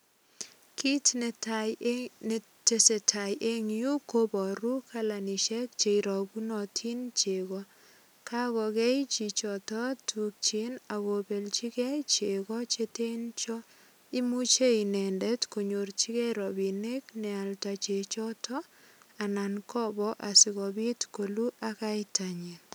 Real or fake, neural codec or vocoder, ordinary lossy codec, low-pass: real; none; none; none